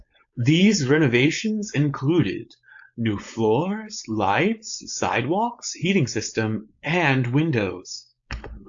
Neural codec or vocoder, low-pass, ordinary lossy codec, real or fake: codec, 16 kHz, 4.8 kbps, FACodec; 7.2 kHz; AAC, 64 kbps; fake